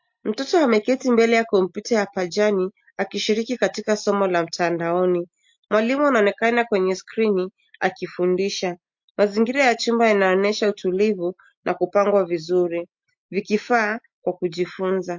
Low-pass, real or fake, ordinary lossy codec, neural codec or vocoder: 7.2 kHz; real; MP3, 48 kbps; none